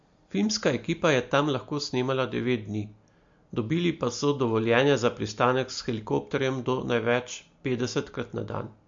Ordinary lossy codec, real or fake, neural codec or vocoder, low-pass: MP3, 48 kbps; real; none; 7.2 kHz